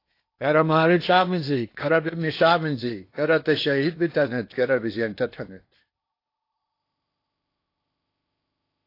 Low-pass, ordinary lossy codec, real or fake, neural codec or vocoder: 5.4 kHz; AAC, 32 kbps; fake; codec, 16 kHz in and 24 kHz out, 0.8 kbps, FocalCodec, streaming, 65536 codes